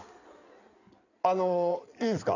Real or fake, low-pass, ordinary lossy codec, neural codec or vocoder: fake; 7.2 kHz; none; codec, 16 kHz in and 24 kHz out, 2.2 kbps, FireRedTTS-2 codec